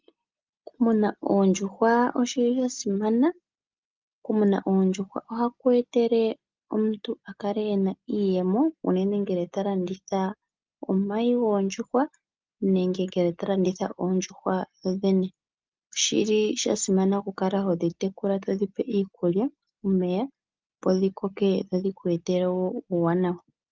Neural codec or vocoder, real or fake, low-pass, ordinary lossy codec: none; real; 7.2 kHz; Opus, 24 kbps